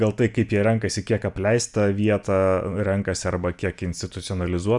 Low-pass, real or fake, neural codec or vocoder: 10.8 kHz; real; none